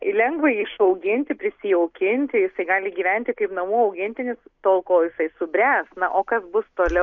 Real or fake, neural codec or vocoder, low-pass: real; none; 7.2 kHz